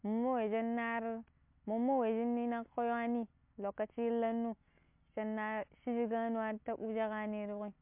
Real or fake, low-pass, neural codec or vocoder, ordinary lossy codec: real; 3.6 kHz; none; MP3, 32 kbps